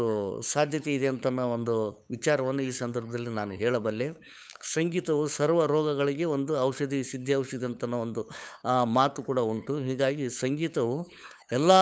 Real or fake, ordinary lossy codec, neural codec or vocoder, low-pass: fake; none; codec, 16 kHz, 8 kbps, FunCodec, trained on LibriTTS, 25 frames a second; none